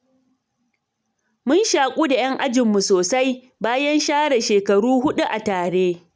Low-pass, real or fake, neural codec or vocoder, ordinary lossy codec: none; real; none; none